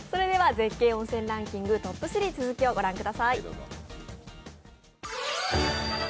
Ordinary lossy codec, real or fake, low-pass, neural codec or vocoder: none; real; none; none